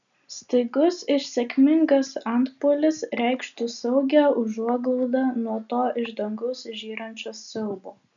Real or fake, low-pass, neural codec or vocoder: real; 7.2 kHz; none